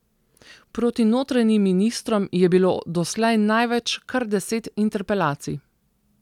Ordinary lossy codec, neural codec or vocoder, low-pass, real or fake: none; none; 19.8 kHz; real